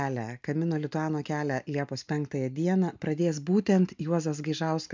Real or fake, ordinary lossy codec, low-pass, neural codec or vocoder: real; MP3, 64 kbps; 7.2 kHz; none